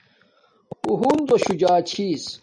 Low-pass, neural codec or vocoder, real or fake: 9.9 kHz; none; real